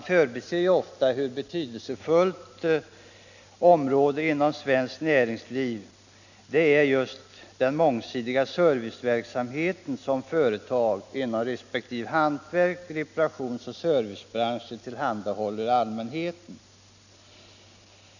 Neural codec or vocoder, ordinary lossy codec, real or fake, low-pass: none; none; real; 7.2 kHz